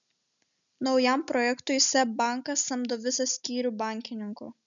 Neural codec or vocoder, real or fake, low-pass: none; real; 7.2 kHz